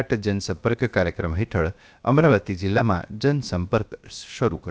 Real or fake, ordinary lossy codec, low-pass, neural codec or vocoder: fake; none; none; codec, 16 kHz, 0.7 kbps, FocalCodec